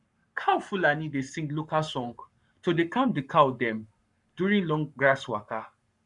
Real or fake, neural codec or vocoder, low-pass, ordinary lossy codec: fake; codec, 44.1 kHz, 7.8 kbps, Pupu-Codec; 10.8 kHz; none